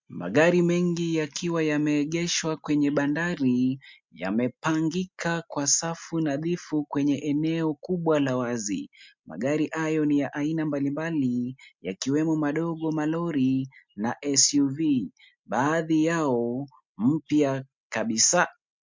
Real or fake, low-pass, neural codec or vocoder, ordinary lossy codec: real; 7.2 kHz; none; MP3, 64 kbps